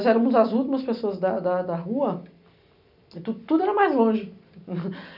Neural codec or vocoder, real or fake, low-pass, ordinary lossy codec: none; real; 5.4 kHz; none